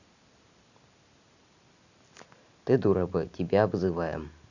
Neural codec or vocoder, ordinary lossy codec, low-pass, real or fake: none; none; 7.2 kHz; real